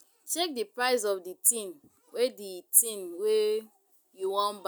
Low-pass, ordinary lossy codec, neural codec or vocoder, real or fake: none; none; none; real